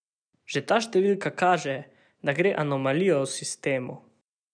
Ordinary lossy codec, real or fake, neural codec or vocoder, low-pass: none; real; none; 9.9 kHz